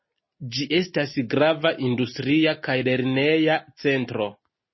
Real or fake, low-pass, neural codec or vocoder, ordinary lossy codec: real; 7.2 kHz; none; MP3, 24 kbps